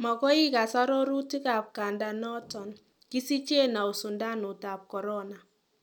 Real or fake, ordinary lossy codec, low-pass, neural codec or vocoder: real; none; 19.8 kHz; none